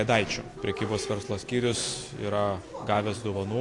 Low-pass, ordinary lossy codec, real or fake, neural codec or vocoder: 10.8 kHz; AAC, 48 kbps; real; none